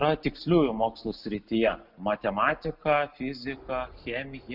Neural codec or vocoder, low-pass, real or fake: none; 5.4 kHz; real